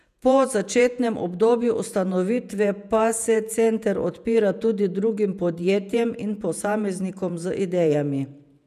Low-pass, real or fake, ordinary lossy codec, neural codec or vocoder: 14.4 kHz; fake; none; vocoder, 48 kHz, 128 mel bands, Vocos